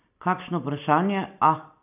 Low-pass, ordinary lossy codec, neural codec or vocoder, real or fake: 3.6 kHz; none; none; real